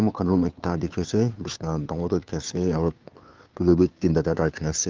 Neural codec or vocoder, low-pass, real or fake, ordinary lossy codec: codec, 16 kHz, 2 kbps, FunCodec, trained on Chinese and English, 25 frames a second; 7.2 kHz; fake; Opus, 24 kbps